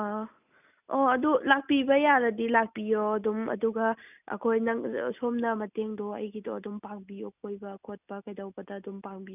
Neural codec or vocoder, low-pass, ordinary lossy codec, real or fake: none; 3.6 kHz; none; real